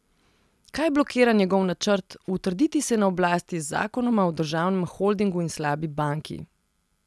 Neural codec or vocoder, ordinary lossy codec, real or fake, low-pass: none; none; real; none